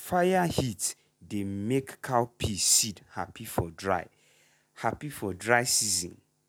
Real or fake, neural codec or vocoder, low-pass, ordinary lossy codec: real; none; none; none